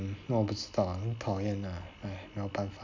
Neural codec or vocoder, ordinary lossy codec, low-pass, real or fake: none; MP3, 64 kbps; 7.2 kHz; real